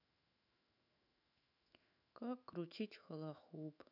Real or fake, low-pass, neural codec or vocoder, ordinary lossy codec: fake; 5.4 kHz; autoencoder, 48 kHz, 128 numbers a frame, DAC-VAE, trained on Japanese speech; none